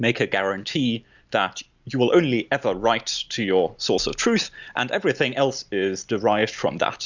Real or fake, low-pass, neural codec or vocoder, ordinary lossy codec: real; 7.2 kHz; none; Opus, 64 kbps